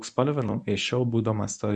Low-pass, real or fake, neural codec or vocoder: 10.8 kHz; fake; codec, 24 kHz, 0.9 kbps, WavTokenizer, medium speech release version 1